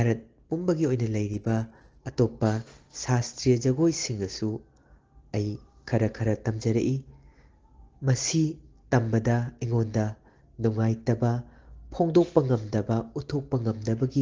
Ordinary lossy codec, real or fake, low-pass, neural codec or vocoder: Opus, 16 kbps; real; 7.2 kHz; none